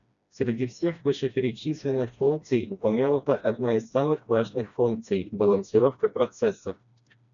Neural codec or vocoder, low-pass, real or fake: codec, 16 kHz, 1 kbps, FreqCodec, smaller model; 7.2 kHz; fake